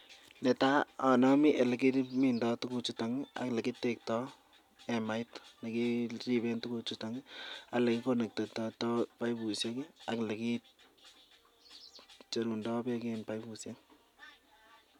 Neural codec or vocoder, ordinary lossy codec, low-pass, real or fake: none; none; 19.8 kHz; real